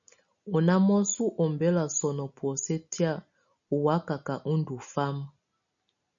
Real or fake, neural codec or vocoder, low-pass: real; none; 7.2 kHz